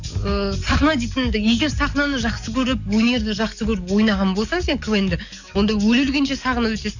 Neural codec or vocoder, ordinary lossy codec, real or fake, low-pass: codec, 44.1 kHz, 7.8 kbps, DAC; none; fake; 7.2 kHz